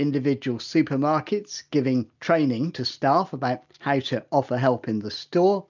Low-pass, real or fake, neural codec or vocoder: 7.2 kHz; real; none